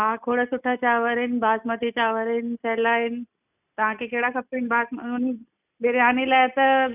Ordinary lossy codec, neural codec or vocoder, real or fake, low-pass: none; none; real; 3.6 kHz